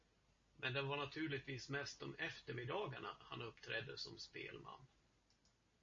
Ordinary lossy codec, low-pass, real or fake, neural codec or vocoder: MP3, 32 kbps; 7.2 kHz; real; none